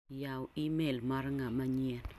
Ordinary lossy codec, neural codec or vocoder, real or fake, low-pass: none; none; real; 14.4 kHz